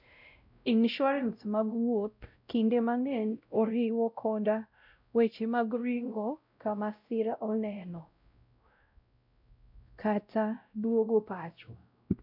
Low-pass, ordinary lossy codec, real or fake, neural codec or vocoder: 5.4 kHz; none; fake; codec, 16 kHz, 0.5 kbps, X-Codec, WavLM features, trained on Multilingual LibriSpeech